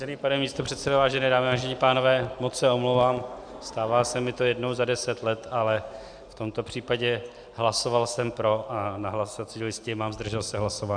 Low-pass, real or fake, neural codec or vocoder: 9.9 kHz; real; none